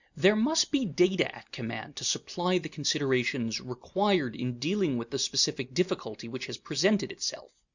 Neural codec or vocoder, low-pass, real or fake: none; 7.2 kHz; real